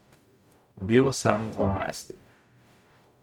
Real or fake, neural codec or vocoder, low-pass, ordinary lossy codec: fake; codec, 44.1 kHz, 0.9 kbps, DAC; 19.8 kHz; MP3, 96 kbps